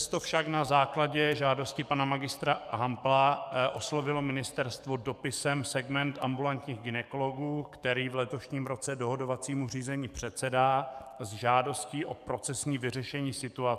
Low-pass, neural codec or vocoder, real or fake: 14.4 kHz; codec, 44.1 kHz, 7.8 kbps, DAC; fake